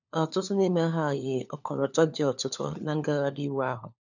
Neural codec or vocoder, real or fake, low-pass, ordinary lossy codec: codec, 16 kHz, 4 kbps, FunCodec, trained on LibriTTS, 50 frames a second; fake; 7.2 kHz; none